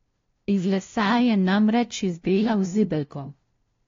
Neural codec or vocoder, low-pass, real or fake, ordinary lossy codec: codec, 16 kHz, 0.5 kbps, FunCodec, trained on LibriTTS, 25 frames a second; 7.2 kHz; fake; AAC, 32 kbps